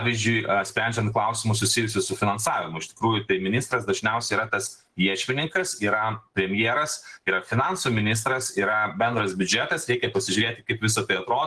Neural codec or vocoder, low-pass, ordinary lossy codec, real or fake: none; 10.8 kHz; Opus, 16 kbps; real